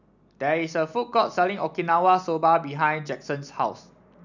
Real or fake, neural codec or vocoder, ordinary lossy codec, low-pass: real; none; none; 7.2 kHz